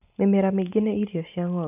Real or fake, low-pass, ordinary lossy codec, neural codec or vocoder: real; 3.6 kHz; none; none